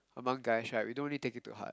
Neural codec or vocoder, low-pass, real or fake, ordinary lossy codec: none; none; real; none